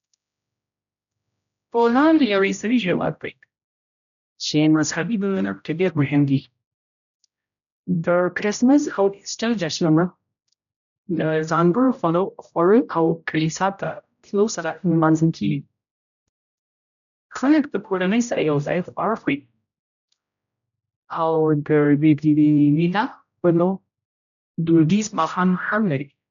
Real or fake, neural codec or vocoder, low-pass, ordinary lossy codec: fake; codec, 16 kHz, 0.5 kbps, X-Codec, HuBERT features, trained on general audio; 7.2 kHz; none